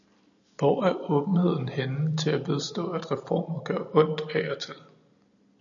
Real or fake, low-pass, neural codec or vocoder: real; 7.2 kHz; none